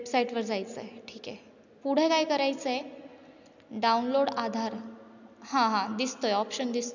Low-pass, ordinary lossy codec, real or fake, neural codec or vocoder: 7.2 kHz; none; real; none